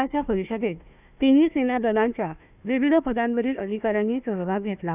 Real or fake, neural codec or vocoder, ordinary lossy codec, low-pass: fake; codec, 16 kHz, 1 kbps, FunCodec, trained on Chinese and English, 50 frames a second; none; 3.6 kHz